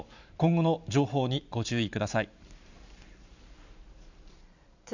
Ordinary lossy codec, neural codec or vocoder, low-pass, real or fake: none; vocoder, 44.1 kHz, 128 mel bands every 512 samples, BigVGAN v2; 7.2 kHz; fake